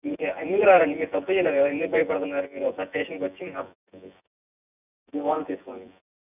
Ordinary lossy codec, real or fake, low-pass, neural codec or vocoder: none; fake; 3.6 kHz; vocoder, 24 kHz, 100 mel bands, Vocos